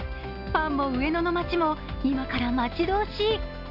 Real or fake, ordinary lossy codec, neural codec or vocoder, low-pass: real; none; none; 5.4 kHz